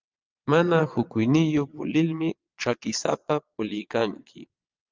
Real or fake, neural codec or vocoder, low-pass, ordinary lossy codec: fake; vocoder, 22.05 kHz, 80 mel bands, Vocos; 7.2 kHz; Opus, 32 kbps